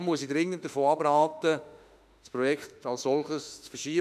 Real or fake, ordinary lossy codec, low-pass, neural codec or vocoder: fake; none; 14.4 kHz; autoencoder, 48 kHz, 32 numbers a frame, DAC-VAE, trained on Japanese speech